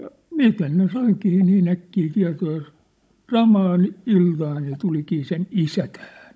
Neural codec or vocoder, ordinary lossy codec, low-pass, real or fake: codec, 16 kHz, 16 kbps, FunCodec, trained on Chinese and English, 50 frames a second; none; none; fake